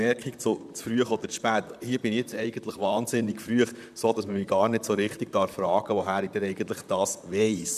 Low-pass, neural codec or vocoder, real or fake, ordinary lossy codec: 14.4 kHz; vocoder, 44.1 kHz, 128 mel bands, Pupu-Vocoder; fake; none